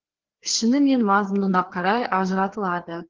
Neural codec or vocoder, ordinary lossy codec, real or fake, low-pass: codec, 16 kHz, 2 kbps, FreqCodec, larger model; Opus, 16 kbps; fake; 7.2 kHz